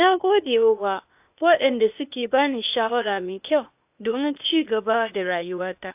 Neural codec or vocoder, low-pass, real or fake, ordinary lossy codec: codec, 16 kHz, 0.8 kbps, ZipCodec; 3.6 kHz; fake; AAC, 32 kbps